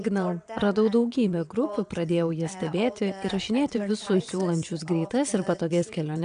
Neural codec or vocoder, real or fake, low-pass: vocoder, 22.05 kHz, 80 mel bands, WaveNeXt; fake; 9.9 kHz